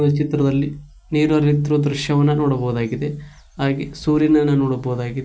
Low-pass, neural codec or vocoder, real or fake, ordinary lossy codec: none; none; real; none